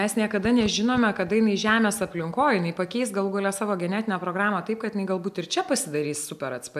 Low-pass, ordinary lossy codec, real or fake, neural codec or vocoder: 14.4 kHz; MP3, 96 kbps; real; none